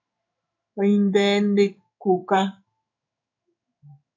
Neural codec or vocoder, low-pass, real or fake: codec, 16 kHz in and 24 kHz out, 1 kbps, XY-Tokenizer; 7.2 kHz; fake